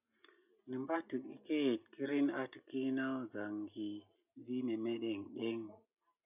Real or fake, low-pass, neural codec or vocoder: real; 3.6 kHz; none